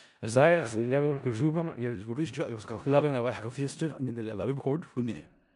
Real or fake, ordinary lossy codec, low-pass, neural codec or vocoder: fake; MP3, 96 kbps; 10.8 kHz; codec, 16 kHz in and 24 kHz out, 0.4 kbps, LongCat-Audio-Codec, four codebook decoder